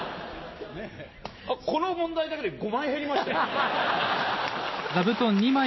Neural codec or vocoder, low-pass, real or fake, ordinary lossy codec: none; 7.2 kHz; real; MP3, 24 kbps